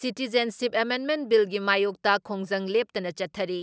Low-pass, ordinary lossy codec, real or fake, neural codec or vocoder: none; none; real; none